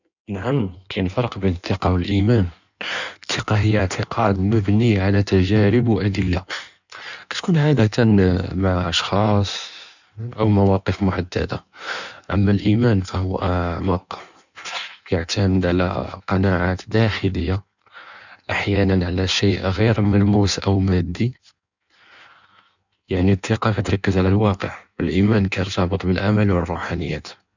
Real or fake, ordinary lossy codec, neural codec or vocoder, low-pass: fake; none; codec, 16 kHz in and 24 kHz out, 1.1 kbps, FireRedTTS-2 codec; 7.2 kHz